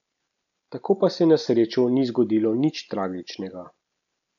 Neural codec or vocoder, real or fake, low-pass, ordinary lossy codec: none; real; 7.2 kHz; none